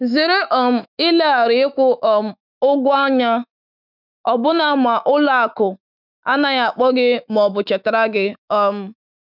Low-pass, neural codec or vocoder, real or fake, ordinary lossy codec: 5.4 kHz; autoencoder, 48 kHz, 128 numbers a frame, DAC-VAE, trained on Japanese speech; fake; none